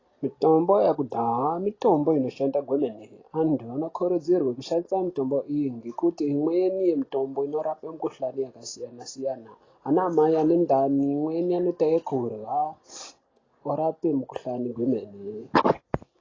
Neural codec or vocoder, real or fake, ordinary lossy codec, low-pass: none; real; AAC, 32 kbps; 7.2 kHz